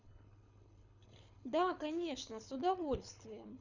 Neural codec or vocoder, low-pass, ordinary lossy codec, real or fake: codec, 24 kHz, 6 kbps, HILCodec; 7.2 kHz; none; fake